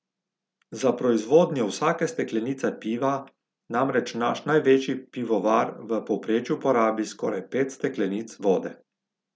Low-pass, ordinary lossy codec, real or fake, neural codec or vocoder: none; none; real; none